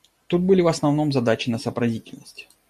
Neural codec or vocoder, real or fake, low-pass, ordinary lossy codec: none; real; 14.4 kHz; MP3, 64 kbps